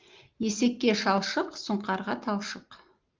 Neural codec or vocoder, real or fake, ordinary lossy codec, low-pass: none; real; Opus, 32 kbps; 7.2 kHz